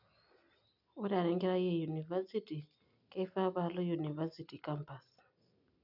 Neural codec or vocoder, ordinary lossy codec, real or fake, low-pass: none; none; real; 5.4 kHz